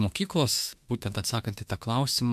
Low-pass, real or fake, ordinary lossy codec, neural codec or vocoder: 14.4 kHz; fake; MP3, 96 kbps; autoencoder, 48 kHz, 32 numbers a frame, DAC-VAE, trained on Japanese speech